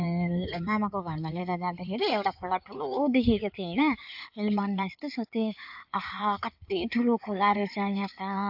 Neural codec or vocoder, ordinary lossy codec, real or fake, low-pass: codec, 16 kHz in and 24 kHz out, 2.2 kbps, FireRedTTS-2 codec; AAC, 48 kbps; fake; 5.4 kHz